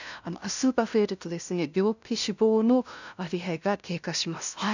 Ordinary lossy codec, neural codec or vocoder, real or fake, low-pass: none; codec, 16 kHz, 0.5 kbps, FunCodec, trained on LibriTTS, 25 frames a second; fake; 7.2 kHz